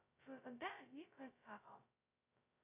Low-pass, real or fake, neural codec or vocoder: 3.6 kHz; fake; codec, 16 kHz, 0.2 kbps, FocalCodec